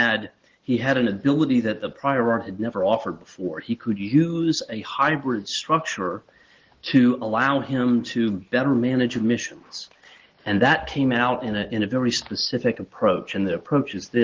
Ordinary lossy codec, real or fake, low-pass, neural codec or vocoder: Opus, 16 kbps; real; 7.2 kHz; none